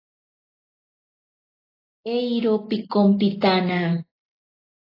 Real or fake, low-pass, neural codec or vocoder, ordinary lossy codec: real; 5.4 kHz; none; AAC, 24 kbps